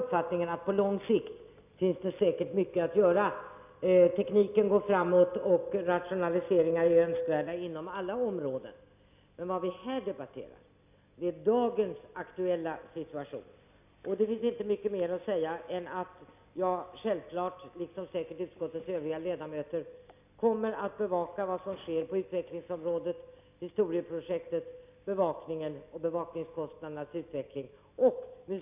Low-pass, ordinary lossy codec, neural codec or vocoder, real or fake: 3.6 kHz; none; none; real